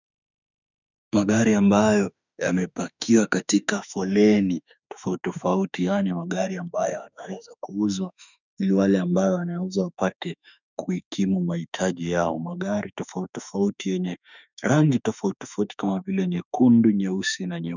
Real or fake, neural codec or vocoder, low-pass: fake; autoencoder, 48 kHz, 32 numbers a frame, DAC-VAE, trained on Japanese speech; 7.2 kHz